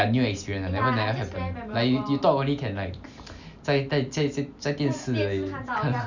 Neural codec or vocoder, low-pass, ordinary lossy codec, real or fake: none; 7.2 kHz; none; real